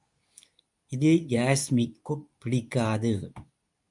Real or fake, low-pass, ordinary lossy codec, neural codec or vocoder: fake; 10.8 kHz; MP3, 96 kbps; codec, 24 kHz, 0.9 kbps, WavTokenizer, medium speech release version 2